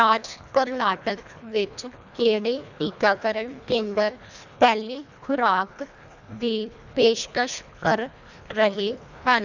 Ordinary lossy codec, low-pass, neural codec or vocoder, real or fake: none; 7.2 kHz; codec, 24 kHz, 1.5 kbps, HILCodec; fake